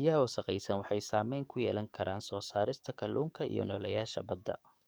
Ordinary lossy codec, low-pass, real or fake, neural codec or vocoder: none; none; fake; codec, 44.1 kHz, 7.8 kbps, DAC